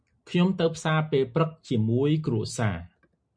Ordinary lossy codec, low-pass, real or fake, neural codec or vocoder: MP3, 64 kbps; 9.9 kHz; real; none